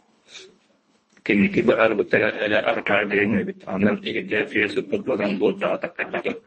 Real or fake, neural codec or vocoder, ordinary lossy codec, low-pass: fake; codec, 24 kHz, 1.5 kbps, HILCodec; MP3, 32 kbps; 10.8 kHz